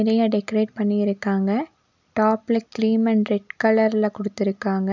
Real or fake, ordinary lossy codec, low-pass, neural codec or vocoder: real; none; 7.2 kHz; none